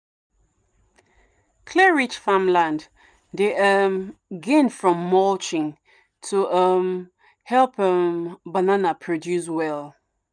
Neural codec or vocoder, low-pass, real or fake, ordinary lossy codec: none; 9.9 kHz; real; none